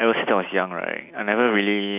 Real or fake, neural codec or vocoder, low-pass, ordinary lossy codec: real; none; 3.6 kHz; none